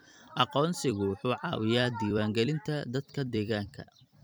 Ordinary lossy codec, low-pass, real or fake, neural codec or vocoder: none; none; fake; vocoder, 44.1 kHz, 128 mel bands every 256 samples, BigVGAN v2